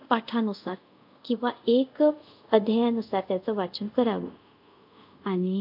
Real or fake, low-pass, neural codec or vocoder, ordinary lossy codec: fake; 5.4 kHz; codec, 24 kHz, 0.5 kbps, DualCodec; MP3, 48 kbps